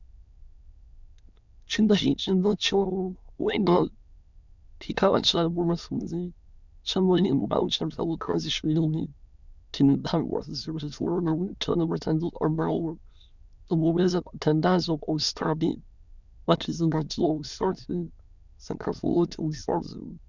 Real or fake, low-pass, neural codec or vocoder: fake; 7.2 kHz; autoencoder, 22.05 kHz, a latent of 192 numbers a frame, VITS, trained on many speakers